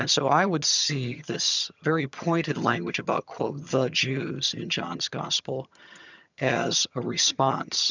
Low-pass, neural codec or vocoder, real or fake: 7.2 kHz; vocoder, 22.05 kHz, 80 mel bands, HiFi-GAN; fake